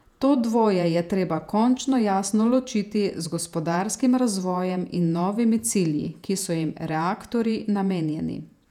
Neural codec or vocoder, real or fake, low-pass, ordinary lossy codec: vocoder, 48 kHz, 128 mel bands, Vocos; fake; 19.8 kHz; none